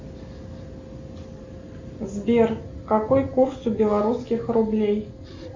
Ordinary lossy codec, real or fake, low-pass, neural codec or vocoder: MP3, 64 kbps; real; 7.2 kHz; none